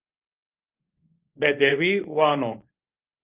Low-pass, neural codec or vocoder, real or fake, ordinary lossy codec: 3.6 kHz; codec, 24 kHz, 0.9 kbps, WavTokenizer, medium speech release version 1; fake; Opus, 32 kbps